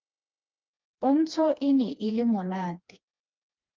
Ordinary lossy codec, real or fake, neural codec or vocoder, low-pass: Opus, 24 kbps; fake; codec, 16 kHz, 2 kbps, FreqCodec, smaller model; 7.2 kHz